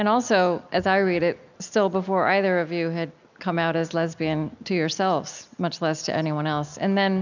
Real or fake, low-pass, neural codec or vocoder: real; 7.2 kHz; none